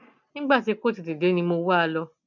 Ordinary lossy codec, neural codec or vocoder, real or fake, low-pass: none; none; real; 7.2 kHz